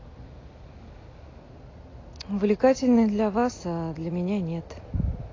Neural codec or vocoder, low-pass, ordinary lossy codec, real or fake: none; 7.2 kHz; AAC, 32 kbps; real